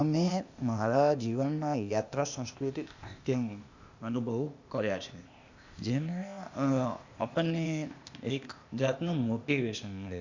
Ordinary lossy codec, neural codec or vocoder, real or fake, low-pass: Opus, 64 kbps; codec, 16 kHz, 0.8 kbps, ZipCodec; fake; 7.2 kHz